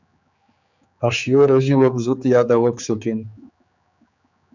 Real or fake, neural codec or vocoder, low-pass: fake; codec, 16 kHz, 4 kbps, X-Codec, HuBERT features, trained on general audio; 7.2 kHz